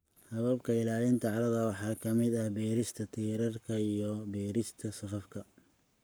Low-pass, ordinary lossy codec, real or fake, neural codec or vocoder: none; none; fake; codec, 44.1 kHz, 7.8 kbps, Pupu-Codec